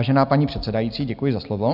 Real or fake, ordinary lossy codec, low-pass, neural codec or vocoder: real; AAC, 48 kbps; 5.4 kHz; none